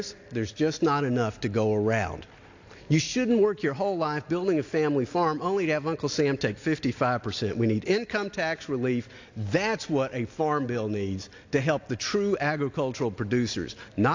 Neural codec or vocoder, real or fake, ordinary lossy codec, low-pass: none; real; AAC, 48 kbps; 7.2 kHz